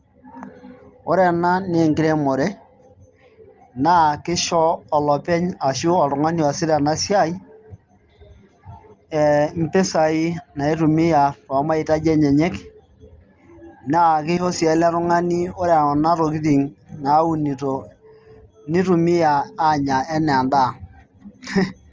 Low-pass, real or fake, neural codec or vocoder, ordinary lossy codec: 7.2 kHz; real; none; Opus, 24 kbps